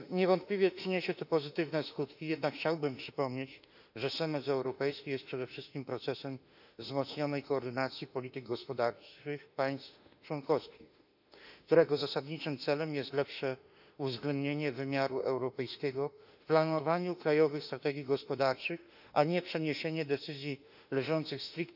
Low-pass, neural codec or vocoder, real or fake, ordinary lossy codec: 5.4 kHz; autoencoder, 48 kHz, 32 numbers a frame, DAC-VAE, trained on Japanese speech; fake; none